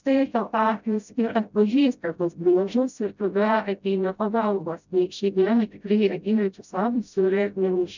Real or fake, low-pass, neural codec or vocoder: fake; 7.2 kHz; codec, 16 kHz, 0.5 kbps, FreqCodec, smaller model